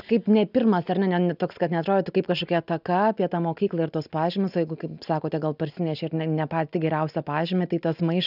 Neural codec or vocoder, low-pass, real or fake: none; 5.4 kHz; real